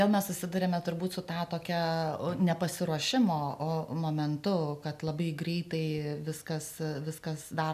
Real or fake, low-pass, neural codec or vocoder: real; 14.4 kHz; none